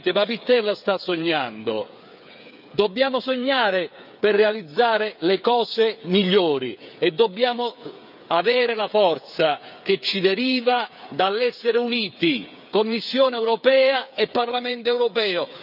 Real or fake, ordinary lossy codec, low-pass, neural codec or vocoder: fake; none; 5.4 kHz; codec, 16 kHz, 8 kbps, FreqCodec, smaller model